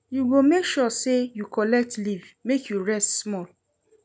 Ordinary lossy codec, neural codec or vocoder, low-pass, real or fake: none; none; none; real